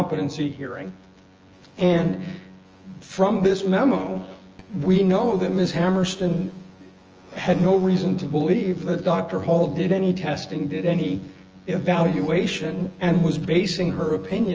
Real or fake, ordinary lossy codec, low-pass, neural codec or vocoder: fake; Opus, 24 kbps; 7.2 kHz; vocoder, 24 kHz, 100 mel bands, Vocos